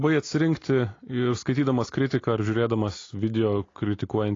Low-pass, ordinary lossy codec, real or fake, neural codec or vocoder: 7.2 kHz; AAC, 32 kbps; real; none